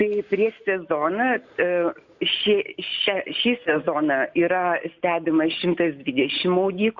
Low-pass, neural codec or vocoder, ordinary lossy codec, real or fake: 7.2 kHz; none; Opus, 64 kbps; real